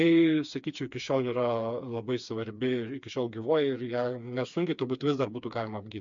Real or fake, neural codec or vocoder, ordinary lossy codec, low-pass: fake; codec, 16 kHz, 4 kbps, FreqCodec, smaller model; MP3, 64 kbps; 7.2 kHz